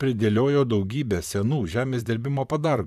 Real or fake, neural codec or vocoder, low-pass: fake; vocoder, 44.1 kHz, 128 mel bands, Pupu-Vocoder; 14.4 kHz